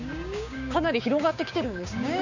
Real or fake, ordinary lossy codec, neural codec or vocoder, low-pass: fake; none; codec, 16 kHz, 6 kbps, DAC; 7.2 kHz